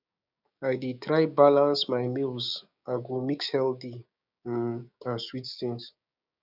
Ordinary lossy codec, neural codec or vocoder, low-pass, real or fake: none; codec, 16 kHz, 6 kbps, DAC; 5.4 kHz; fake